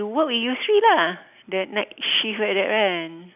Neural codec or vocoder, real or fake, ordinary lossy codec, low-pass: none; real; none; 3.6 kHz